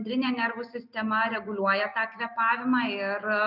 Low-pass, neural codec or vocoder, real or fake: 5.4 kHz; none; real